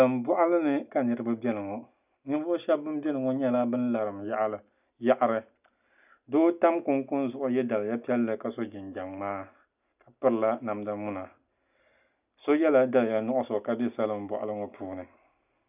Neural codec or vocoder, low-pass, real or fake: none; 3.6 kHz; real